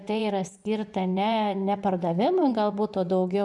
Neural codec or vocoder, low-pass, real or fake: vocoder, 24 kHz, 100 mel bands, Vocos; 10.8 kHz; fake